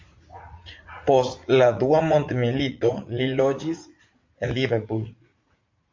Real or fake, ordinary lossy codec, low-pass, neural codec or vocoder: fake; MP3, 48 kbps; 7.2 kHz; vocoder, 44.1 kHz, 80 mel bands, Vocos